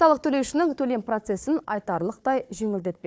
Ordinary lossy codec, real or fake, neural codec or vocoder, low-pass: none; fake; codec, 16 kHz, 4 kbps, FunCodec, trained on Chinese and English, 50 frames a second; none